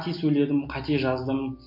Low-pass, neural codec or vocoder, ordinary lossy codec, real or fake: 5.4 kHz; none; MP3, 32 kbps; real